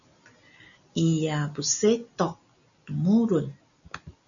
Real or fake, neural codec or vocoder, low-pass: real; none; 7.2 kHz